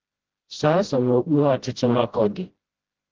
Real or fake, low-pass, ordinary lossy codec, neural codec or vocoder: fake; 7.2 kHz; Opus, 16 kbps; codec, 16 kHz, 0.5 kbps, FreqCodec, smaller model